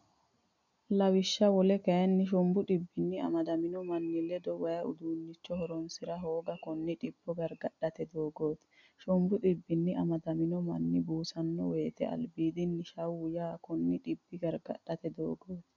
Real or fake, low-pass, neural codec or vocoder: real; 7.2 kHz; none